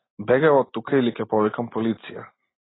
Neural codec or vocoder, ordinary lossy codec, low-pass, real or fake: none; AAC, 16 kbps; 7.2 kHz; real